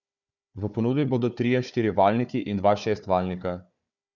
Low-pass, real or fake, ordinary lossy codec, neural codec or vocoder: 7.2 kHz; fake; none; codec, 16 kHz, 4 kbps, FunCodec, trained on Chinese and English, 50 frames a second